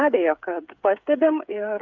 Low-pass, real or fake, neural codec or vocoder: 7.2 kHz; fake; codec, 16 kHz, 8 kbps, FreqCodec, smaller model